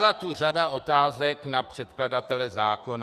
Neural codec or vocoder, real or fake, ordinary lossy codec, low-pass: codec, 44.1 kHz, 2.6 kbps, SNAC; fake; Opus, 64 kbps; 14.4 kHz